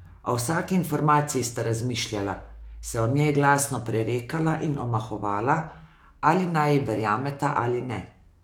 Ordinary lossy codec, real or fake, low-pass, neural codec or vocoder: none; fake; 19.8 kHz; codec, 44.1 kHz, 7.8 kbps, DAC